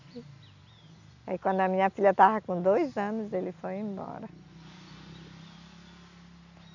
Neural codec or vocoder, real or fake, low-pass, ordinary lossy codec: none; real; 7.2 kHz; none